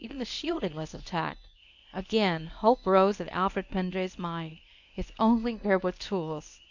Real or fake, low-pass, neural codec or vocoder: fake; 7.2 kHz; codec, 24 kHz, 0.9 kbps, WavTokenizer, medium speech release version 1